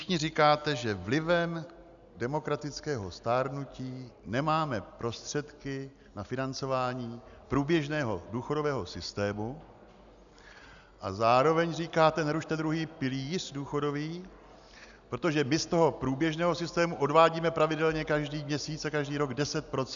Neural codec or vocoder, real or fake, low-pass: none; real; 7.2 kHz